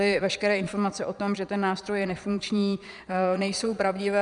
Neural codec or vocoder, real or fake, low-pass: vocoder, 22.05 kHz, 80 mel bands, Vocos; fake; 9.9 kHz